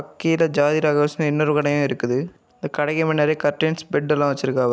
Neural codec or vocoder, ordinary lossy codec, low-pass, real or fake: none; none; none; real